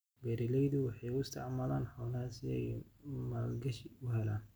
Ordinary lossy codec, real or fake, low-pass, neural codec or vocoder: none; real; none; none